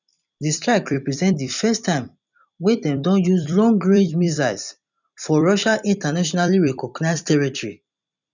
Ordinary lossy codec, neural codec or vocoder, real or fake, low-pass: none; vocoder, 44.1 kHz, 128 mel bands every 256 samples, BigVGAN v2; fake; 7.2 kHz